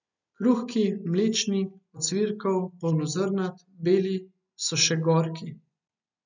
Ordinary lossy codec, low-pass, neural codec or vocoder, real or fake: none; 7.2 kHz; none; real